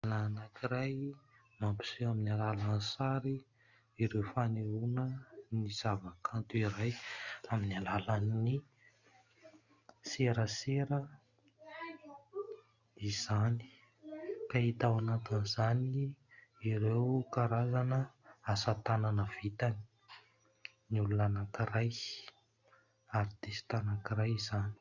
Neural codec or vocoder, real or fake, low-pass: none; real; 7.2 kHz